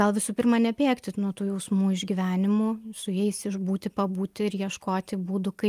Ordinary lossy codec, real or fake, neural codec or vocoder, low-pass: Opus, 32 kbps; real; none; 14.4 kHz